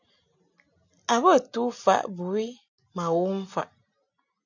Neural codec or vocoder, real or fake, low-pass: none; real; 7.2 kHz